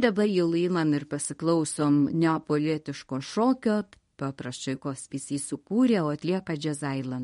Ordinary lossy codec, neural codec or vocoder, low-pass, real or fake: MP3, 48 kbps; codec, 24 kHz, 0.9 kbps, WavTokenizer, medium speech release version 1; 10.8 kHz; fake